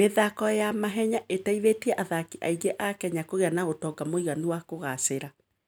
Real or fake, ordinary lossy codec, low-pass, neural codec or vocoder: real; none; none; none